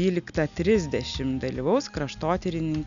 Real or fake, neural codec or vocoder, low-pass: real; none; 7.2 kHz